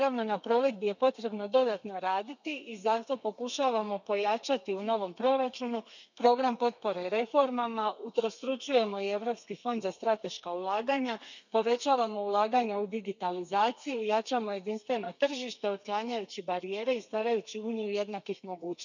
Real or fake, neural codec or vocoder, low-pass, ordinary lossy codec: fake; codec, 32 kHz, 1.9 kbps, SNAC; 7.2 kHz; none